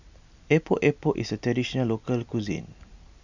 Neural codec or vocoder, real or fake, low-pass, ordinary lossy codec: none; real; 7.2 kHz; none